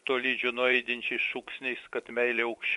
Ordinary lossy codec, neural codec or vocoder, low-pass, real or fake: MP3, 64 kbps; vocoder, 24 kHz, 100 mel bands, Vocos; 10.8 kHz; fake